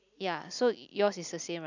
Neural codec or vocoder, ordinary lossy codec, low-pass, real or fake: none; none; 7.2 kHz; real